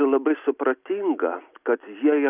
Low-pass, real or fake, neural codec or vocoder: 3.6 kHz; real; none